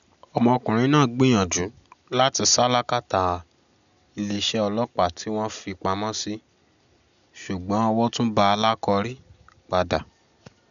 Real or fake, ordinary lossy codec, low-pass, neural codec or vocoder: real; none; 7.2 kHz; none